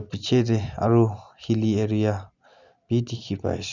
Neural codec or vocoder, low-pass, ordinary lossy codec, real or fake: none; 7.2 kHz; none; real